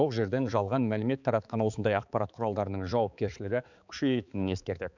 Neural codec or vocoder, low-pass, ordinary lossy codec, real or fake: codec, 16 kHz, 4 kbps, X-Codec, HuBERT features, trained on balanced general audio; 7.2 kHz; none; fake